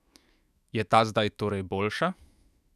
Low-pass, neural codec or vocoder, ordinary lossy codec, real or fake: 14.4 kHz; autoencoder, 48 kHz, 128 numbers a frame, DAC-VAE, trained on Japanese speech; none; fake